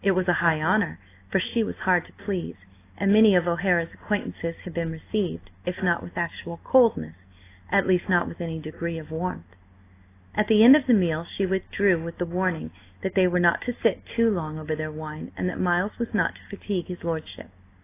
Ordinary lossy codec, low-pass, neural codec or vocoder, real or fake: AAC, 24 kbps; 3.6 kHz; none; real